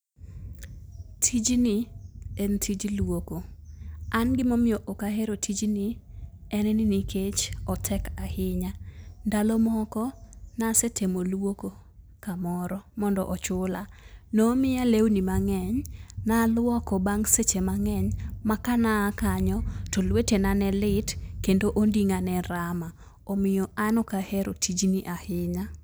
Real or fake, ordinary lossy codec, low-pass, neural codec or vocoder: real; none; none; none